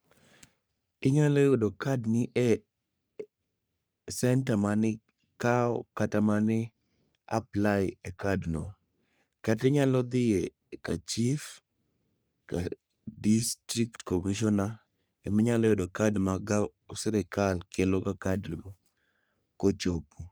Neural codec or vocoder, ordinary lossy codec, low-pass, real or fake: codec, 44.1 kHz, 3.4 kbps, Pupu-Codec; none; none; fake